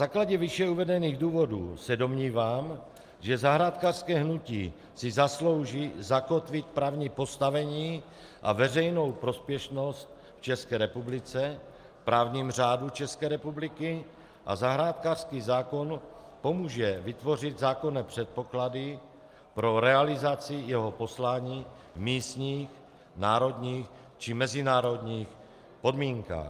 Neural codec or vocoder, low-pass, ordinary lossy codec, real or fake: none; 14.4 kHz; Opus, 32 kbps; real